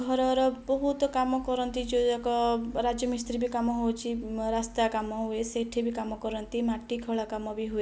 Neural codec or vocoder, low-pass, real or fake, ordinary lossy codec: none; none; real; none